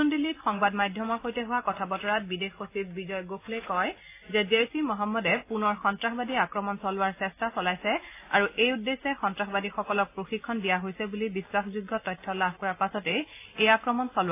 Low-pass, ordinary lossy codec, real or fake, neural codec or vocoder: 3.6 kHz; AAC, 24 kbps; real; none